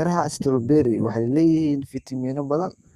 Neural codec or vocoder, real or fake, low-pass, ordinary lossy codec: codec, 32 kHz, 1.9 kbps, SNAC; fake; 14.4 kHz; Opus, 64 kbps